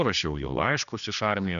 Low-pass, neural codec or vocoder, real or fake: 7.2 kHz; codec, 16 kHz, 2 kbps, X-Codec, HuBERT features, trained on general audio; fake